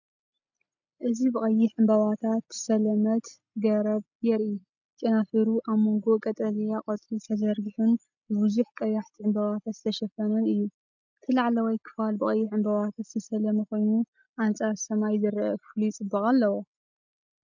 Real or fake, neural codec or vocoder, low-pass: real; none; 7.2 kHz